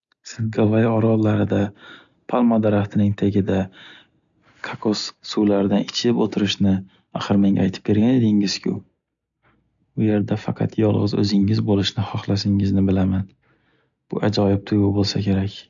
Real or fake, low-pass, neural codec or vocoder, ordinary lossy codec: real; 7.2 kHz; none; none